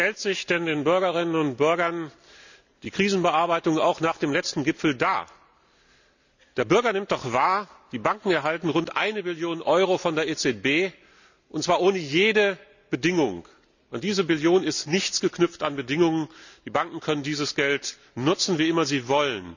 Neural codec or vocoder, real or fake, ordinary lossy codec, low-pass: none; real; none; 7.2 kHz